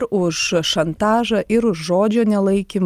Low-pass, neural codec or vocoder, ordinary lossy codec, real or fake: 14.4 kHz; none; Opus, 32 kbps; real